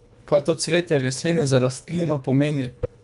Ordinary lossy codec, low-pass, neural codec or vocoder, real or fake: none; 10.8 kHz; codec, 24 kHz, 1.5 kbps, HILCodec; fake